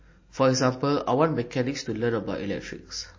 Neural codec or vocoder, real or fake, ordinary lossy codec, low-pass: none; real; MP3, 32 kbps; 7.2 kHz